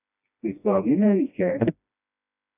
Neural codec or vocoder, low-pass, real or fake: codec, 16 kHz, 1 kbps, FreqCodec, smaller model; 3.6 kHz; fake